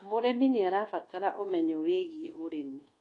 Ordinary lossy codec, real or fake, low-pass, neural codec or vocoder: AAC, 32 kbps; fake; 10.8 kHz; codec, 24 kHz, 1.2 kbps, DualCodec